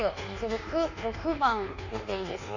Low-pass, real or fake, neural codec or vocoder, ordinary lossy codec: 7.2 kHz; fake; codec, 24 kHz, 1.2 kbps, DualCodec; none